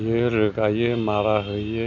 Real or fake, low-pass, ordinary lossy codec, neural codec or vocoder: real; 7.2 kHz; none; none